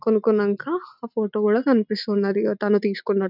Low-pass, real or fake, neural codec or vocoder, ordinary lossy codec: 5.4 kHz; real; none; none